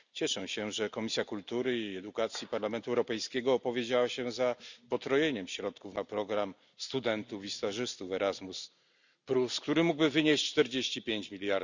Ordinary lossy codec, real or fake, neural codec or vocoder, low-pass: none; real; none; 7.2 kHz